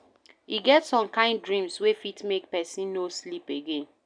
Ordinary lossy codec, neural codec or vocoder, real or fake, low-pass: MP3, 96 kbps; none; real; 9.9 kHz